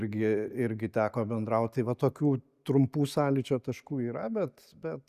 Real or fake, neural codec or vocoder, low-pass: real; none; 14.4 kHz